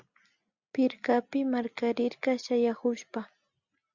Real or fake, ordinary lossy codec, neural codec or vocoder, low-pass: real; Opus, 64 kbps; none; 7.2 kHz